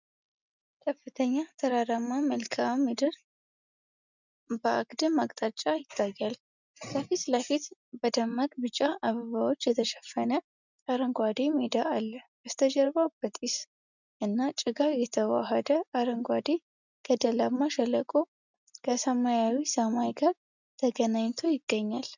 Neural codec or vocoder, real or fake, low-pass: none; real; 7.2 kHz